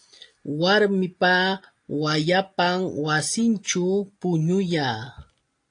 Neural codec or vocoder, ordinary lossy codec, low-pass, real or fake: none; AAC, 48 kbps; 9.9 kHz; real